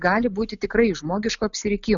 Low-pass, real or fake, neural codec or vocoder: 7.2 kHz; real; none